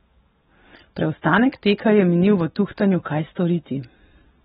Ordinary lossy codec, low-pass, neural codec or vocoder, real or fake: AAC, 16 kbps; 19.8 kHz; none; real